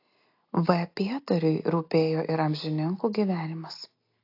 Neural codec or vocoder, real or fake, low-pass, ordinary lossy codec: none; real; 5.4 kHz; AAC, 32 kbps